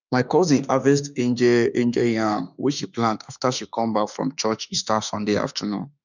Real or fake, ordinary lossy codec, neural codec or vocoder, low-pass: fake; none; autoencoder, 48 kHz, 32 numbers a frame, DAC-VAE, trained on Japanese speech; 7.2 kHz